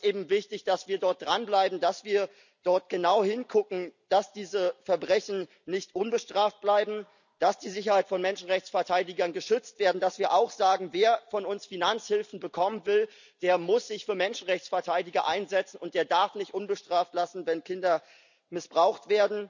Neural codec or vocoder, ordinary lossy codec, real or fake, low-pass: none; none; real; 7.2 kHz